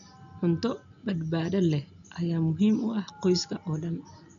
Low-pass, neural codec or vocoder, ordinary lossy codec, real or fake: 7.2 kHz; none; none; real